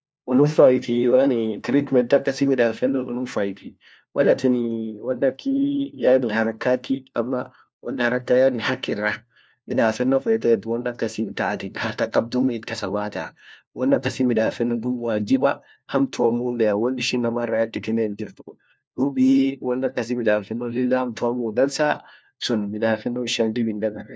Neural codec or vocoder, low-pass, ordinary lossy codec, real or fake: codec, 16 kHz, 1 kbps, FunCodec, trained on LibriTTS, 50 frames a second; none; none; fake